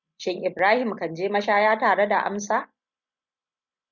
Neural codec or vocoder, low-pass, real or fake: none; 7.2 kHz; real